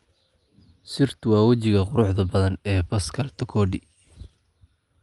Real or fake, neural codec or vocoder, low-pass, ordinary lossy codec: real; none; 10.8 kHz; Opus, 32 kbps